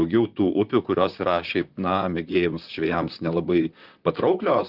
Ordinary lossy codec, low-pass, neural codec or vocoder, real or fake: Opus, 24 kbps; 5.4 kHz; vocoder, 44.1 kHz, 128 mel bands, Pupu-Vocoder; fake